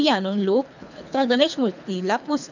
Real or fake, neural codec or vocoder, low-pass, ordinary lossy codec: fake; codec, 24 kHz, 3 kbps, HILCodec; 7.2 kHz; none